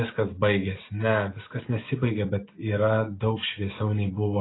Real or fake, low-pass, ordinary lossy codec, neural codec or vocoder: real; 7.2 kHz; AAC, 16 kbps; none